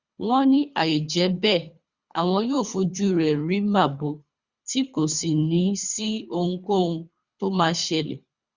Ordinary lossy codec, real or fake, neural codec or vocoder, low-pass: Opus, 64 kbps; fake; codec, 24 kHz, 3 kbps, HILCodec; 7.2 kHz